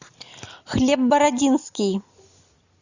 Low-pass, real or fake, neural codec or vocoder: 7.2 kHz; real; none